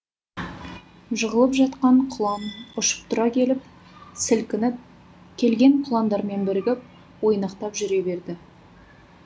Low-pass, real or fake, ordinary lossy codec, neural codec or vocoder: none; real; none; none